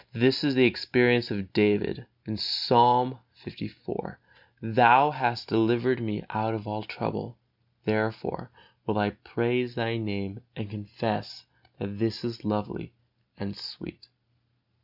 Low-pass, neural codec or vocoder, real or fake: 5.4 kHz; none; real